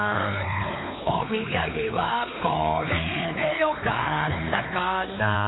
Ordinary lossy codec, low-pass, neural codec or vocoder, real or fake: AAC, 16 kbps; 7.2 kHz; codec, 16 kHz, 4 kbps, X-Codec, WavLM features, trained on Multilingual LibriSpeech; fake